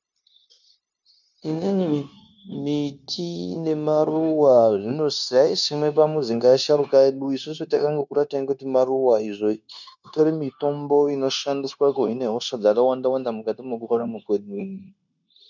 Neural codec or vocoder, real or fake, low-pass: codec, 16 kHz, 0.9 kbps, LongCat-Audio-Codec; fake; 7.2 kHz